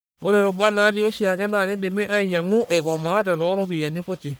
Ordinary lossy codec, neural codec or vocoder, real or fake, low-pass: none; codec, 44.1 kHz, 1.7 kbps, Pupu-Codec; fake; none